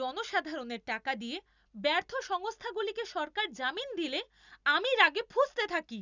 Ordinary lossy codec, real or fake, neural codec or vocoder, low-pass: none; real; none; 7.2 kHz